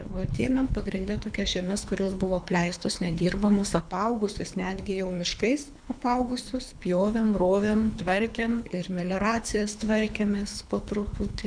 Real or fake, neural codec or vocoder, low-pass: fake; codec, 24 kHz, 3 kbps, HILCodec; 9.9 kHz